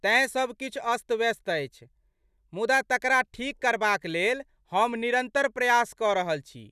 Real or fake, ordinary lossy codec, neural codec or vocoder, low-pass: real; none; none; 14.4 kHz